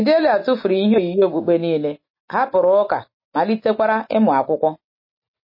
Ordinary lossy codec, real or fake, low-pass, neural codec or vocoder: MP3, 24 kbps; real; 5.4 kHz; none